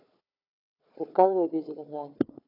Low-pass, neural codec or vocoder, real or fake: 5.4 kHz; codec, 16 kHz, 4 kbps, FunCodec, trained on Chinese and English, 50 frames a second; fake